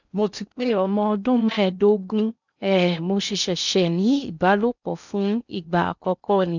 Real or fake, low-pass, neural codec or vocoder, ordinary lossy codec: fake; 7.2 kHz; codec, 16 kHz in and 24 kHz out, 0.6 kbps, FocalCodec, streaming, 2048 codes; none